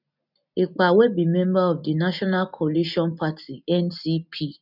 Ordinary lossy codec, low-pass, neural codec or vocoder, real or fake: none; 5.4 kHz; none; real